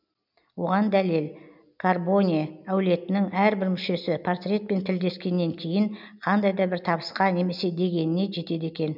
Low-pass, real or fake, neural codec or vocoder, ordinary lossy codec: 5.4 kHz; real; none; none